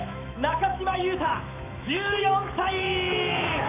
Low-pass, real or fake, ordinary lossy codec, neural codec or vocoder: 3.6 kHz; fake; none; autoencoder, 48 kHz, 128 numbers a frame, DAC-VAE, trained on Japanese speech